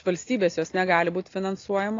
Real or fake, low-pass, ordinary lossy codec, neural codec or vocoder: real; 7.2 kHz; AAC, 32 kbps; none